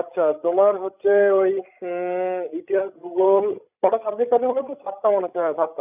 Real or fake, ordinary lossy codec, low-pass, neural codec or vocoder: fake; none; 3.6 kHz; codec, 16 kHz, 16 kbps, FreqCodec, larger model